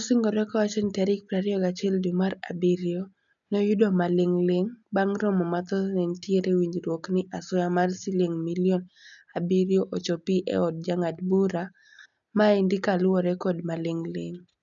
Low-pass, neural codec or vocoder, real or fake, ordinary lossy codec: 7.2 kHz; none; real; none